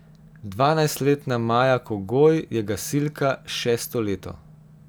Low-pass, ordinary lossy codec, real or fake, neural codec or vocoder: none; none; real; none